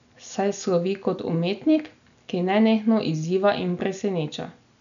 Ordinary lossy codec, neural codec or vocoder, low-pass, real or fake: none; none; 7.2 kHz; real